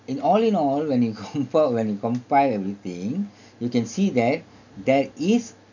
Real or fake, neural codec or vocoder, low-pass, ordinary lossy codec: real; none; 7.2 kHz; none